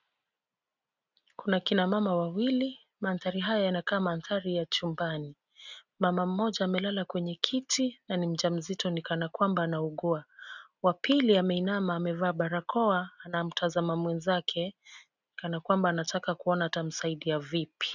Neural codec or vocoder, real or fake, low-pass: none; real; 7.2 kHz